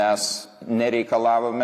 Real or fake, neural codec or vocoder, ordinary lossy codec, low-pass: real; none; AAC, 64 kbps; 14.4 kHz